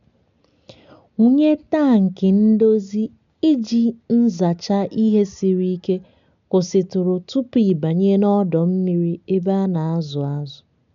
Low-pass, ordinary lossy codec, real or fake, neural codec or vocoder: 7.2 kHz; none; real; none